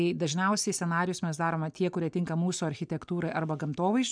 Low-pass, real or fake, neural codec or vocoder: 9.9 kHz; real; none